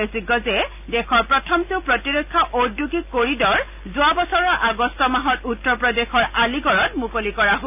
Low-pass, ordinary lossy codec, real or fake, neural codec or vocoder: 3.6 kHz; none; real; none